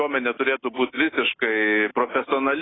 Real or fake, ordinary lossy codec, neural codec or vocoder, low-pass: real; AAC, 16 kbps; none; 7.2 kHz